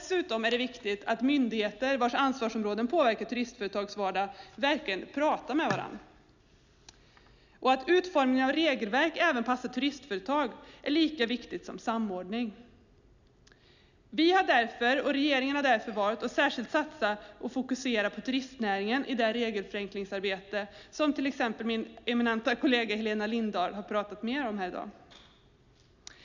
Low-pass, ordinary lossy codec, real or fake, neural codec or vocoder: 7.2 kHz; none; real; none